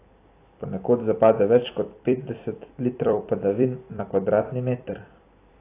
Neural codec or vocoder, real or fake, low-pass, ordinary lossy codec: vocoder, 44.1 kHz, 128 mel bands every 256 samples, BigVGAN v2; fake; 3.6 kHz; AAC, 24 kbps